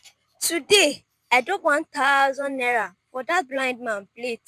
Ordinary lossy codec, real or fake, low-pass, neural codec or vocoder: none; fake; 14.4 kHz; vocoder, 44.1 kHz, 128 mel bands every 512 samples, BigVGAN v2